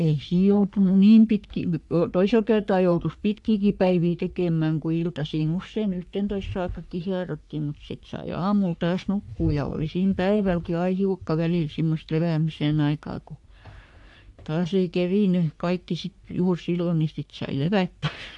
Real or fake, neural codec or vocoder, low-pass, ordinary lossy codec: fake; codec, 44.1 kHz, 3.4 kbps, Pupu-Codec; 10.8 kHz; AAC, 64 kbps